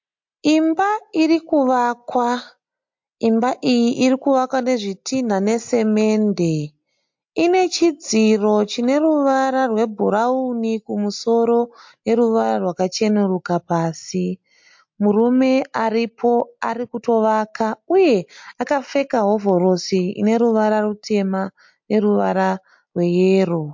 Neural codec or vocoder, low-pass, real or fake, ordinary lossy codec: none; 7.2 kHz; real; MP3, 48 kbps